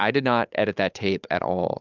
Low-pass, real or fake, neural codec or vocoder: 7.2 kHz; fake; codec, 16 kHz, 8 kbps, FunCodec, trained on Chinese and English, 25 frames a second